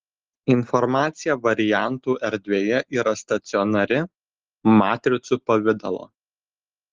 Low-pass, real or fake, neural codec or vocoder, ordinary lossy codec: 7.2 kHz; real; none; Opus, 16 kbps